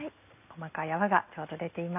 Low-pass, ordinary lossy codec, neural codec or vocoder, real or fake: 3.6 kHz; none; none; real